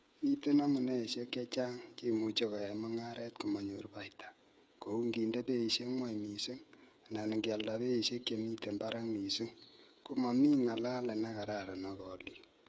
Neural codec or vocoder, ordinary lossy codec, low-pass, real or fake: codec, 16 kHz, 8 kbps, FreqCodec, smaller model; none; none; fake